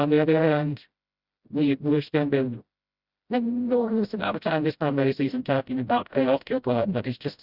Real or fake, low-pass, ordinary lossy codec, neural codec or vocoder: fake; 5.4 kHz; Opus, 64 kbps; codec, 16 kHz, 0.5 kbps, FreqCodec, smaller model